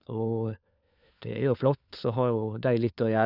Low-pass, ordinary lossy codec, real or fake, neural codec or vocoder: 5.4 kHz; none; fake; codec, 16 kHz, 4 kbps, FunCodec, trained on LibriTTS, 50 frames a second